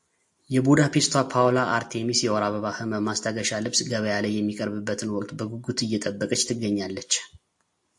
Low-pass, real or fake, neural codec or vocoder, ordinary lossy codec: 10.8 kHz; real; none; AAC, 64 kbps